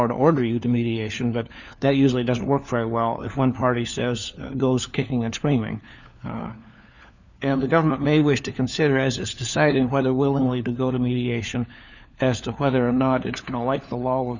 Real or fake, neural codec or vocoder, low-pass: fake; codec, 16 kHz, 4 kbps, FunCodec, trained on LibriTTS, 50 frames a second; 7.2 kHz